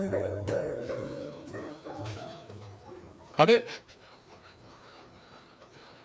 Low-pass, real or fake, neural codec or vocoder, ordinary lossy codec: none; fake; codec, 16 kHz, 2 kbps, FreqCodec, larger model; none